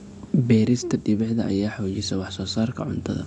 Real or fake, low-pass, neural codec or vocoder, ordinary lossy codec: real; 10.8 kHz; none; AAC, 64 kbps